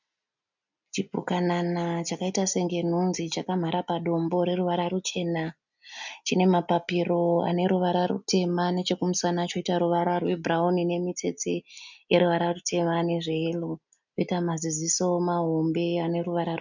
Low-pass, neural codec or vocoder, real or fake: 7.2 kHz; none; real